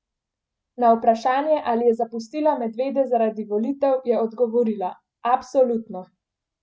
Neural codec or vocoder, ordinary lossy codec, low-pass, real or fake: none; none; none; real